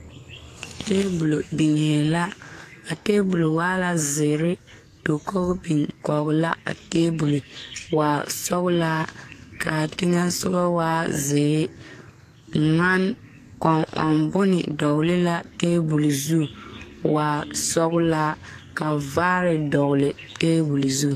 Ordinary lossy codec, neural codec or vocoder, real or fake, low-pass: AAC, 64 kbps; codec, 44.1 kHz, 2.6 kbps, SNAC; fake; 14.4 kHz